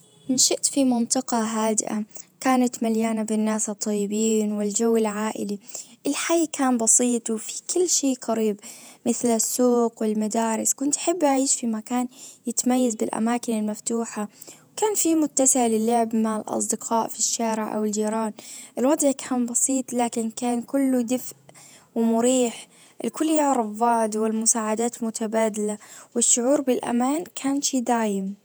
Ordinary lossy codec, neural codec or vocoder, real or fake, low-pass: none; vocoder, 48 kHz, 128 mel bands, Vocos; fake; none